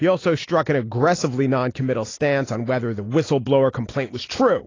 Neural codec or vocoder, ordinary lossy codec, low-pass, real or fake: none; AAC, 32 kbps; 7.2 kHz; real